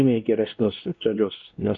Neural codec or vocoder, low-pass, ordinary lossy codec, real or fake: codec, 16 kHz, 1 kbps, X-Codec, WavLM features, trained on Multilingual LibriSpeech; 7.2 kHz; MP3, 64 kbps; fake